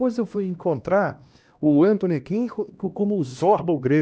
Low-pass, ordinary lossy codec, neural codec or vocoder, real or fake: none; none; codec, 16 kHz, 1 kbps, X-Codec, HuBERT features, trained on LibriSpeech; fake